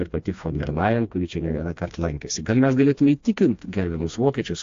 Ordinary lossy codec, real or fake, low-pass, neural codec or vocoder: AAC, 48 kbps; fake; 7.2 kHz; codec, 16 kHz, 2 kbps, FreqCodec, smaller model